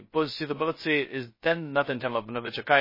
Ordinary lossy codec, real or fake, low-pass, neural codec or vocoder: MP3, 24 kbps; fake; 5.4 kHz; codec, 16 kHz, 0.2 kbps, FocalCodec